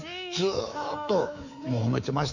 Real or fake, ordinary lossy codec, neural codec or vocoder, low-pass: fake; none; codec, 24 kHz, 3.1 kbps, DualCodec; 7.2 kHz